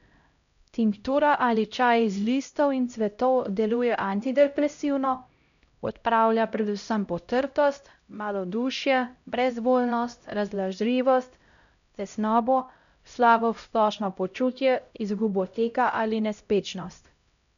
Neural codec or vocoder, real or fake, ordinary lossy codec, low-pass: codec, 16 kHz, 0.5 kbps, X-Codec, HuBERT features, trained on LibriSpeech; fake; none; 7.2 kHz